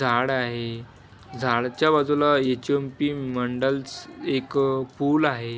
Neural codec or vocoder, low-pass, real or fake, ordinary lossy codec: none; none; real; none